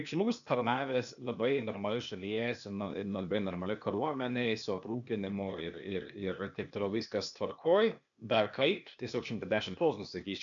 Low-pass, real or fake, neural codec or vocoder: 7.2 kHz; fake; codec, 16 kHz, 0.8 kbps, ZipCodec